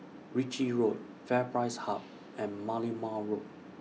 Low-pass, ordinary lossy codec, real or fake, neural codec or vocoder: none; none; real; none